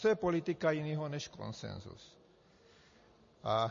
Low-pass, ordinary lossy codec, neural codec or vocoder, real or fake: 7.2 kHz; MP3, 32 kbps; none; real